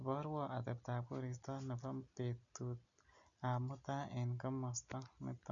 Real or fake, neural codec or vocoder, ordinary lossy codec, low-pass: real; none; none; 7.2 kHz